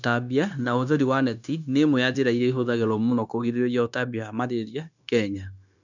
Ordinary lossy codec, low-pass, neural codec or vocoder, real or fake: none; 7.2 kHz; codec, 16 kHz, 0.9 kbps, LongCat-Audio-Codec; fake